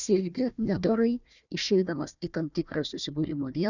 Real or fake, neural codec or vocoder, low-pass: fake; codec, 16 kHz, 1 kbps, FunCodec, trained on Chinese and English, 50 frames a second; 7.2 kHz